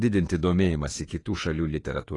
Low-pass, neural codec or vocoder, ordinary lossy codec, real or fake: 10.8 kHz; autoencoder, 48 kHz, 32 numbers a frame, DAC-VAE, trained on Japanese speech; AAC, 32 kbps; fake